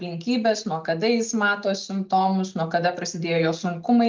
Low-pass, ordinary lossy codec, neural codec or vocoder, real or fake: 7.2 kHz; Opus, 32 kbps; none; real